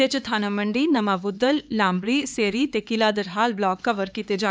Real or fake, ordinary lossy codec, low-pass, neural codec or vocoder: fake; none; none; codec, 16 kHz, 4 kbps, X-Codec, HuBERT features, trained on LibriSpeech